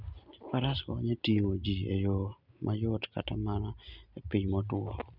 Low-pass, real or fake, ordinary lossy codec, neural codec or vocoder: 5.4 kHz; real; none; none